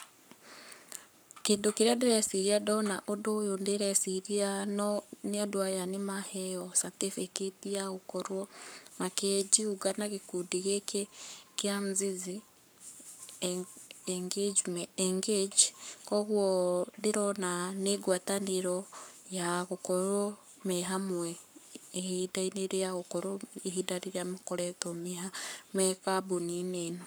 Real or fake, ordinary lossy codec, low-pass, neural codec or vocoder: fake; none; none; codec, 44.1 kHz, 7.8 kbps, Pupu-Codec